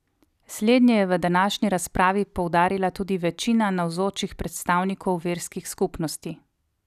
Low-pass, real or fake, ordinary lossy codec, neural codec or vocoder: 14.4 kHz; real; none; none